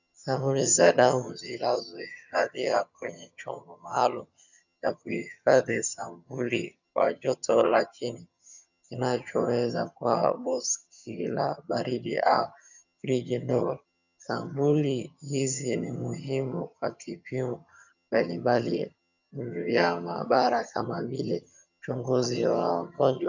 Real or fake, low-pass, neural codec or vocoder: fake; 7.2 kHz; vocoder, 22.05 kHz, 80 mel bands, HiFi-GAN